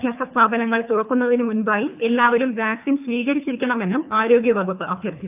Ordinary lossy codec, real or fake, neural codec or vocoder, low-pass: AAC, 32 kbps; fake; codec, 24 kHz, 3 kbps, HILCodec; 3.6 kHz